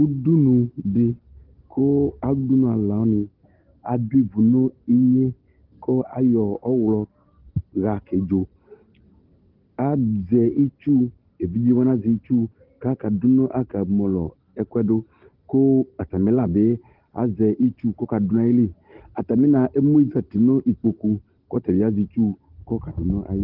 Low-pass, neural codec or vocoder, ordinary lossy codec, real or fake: 5.4 kHz; none; Opus, 16 kbps; real